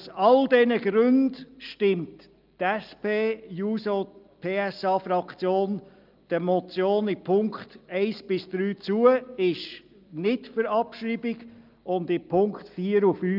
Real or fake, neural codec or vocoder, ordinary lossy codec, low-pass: real; none; Opus, 24 kbps; 5.4 kHz